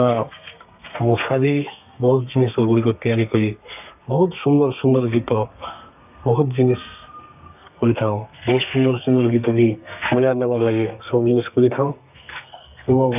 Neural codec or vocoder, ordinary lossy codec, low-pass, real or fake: codec, 32 kHz, 1.9 kbps, SNAC; none; 3.6 kHz; fake